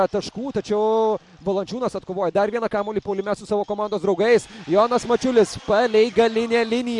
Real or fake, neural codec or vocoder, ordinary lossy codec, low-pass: real; none; MP3, 64 kbps; 10.8 kHz